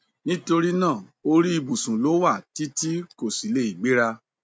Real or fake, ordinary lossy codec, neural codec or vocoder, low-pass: real; none; none; none